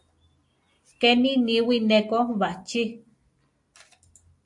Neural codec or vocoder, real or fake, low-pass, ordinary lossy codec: none; real; 10.8 kHz; AAC, 64 kbps